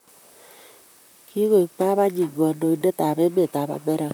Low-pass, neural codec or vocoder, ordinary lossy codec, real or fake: none; vocoder, 44.1 kHz, 128 mel bands, Pupu-Vocoder; none; fake